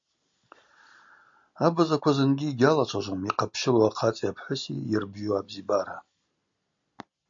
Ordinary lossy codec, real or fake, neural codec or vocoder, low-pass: MP3, 48 kbps; real; none; 7.2 kHz